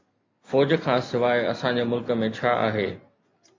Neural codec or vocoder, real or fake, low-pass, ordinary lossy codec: none; real; 7.2 kHz; MP3, 48 kbps